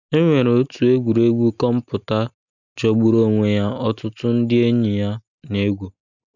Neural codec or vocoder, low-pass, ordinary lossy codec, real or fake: none; 7.2 kHz; none; real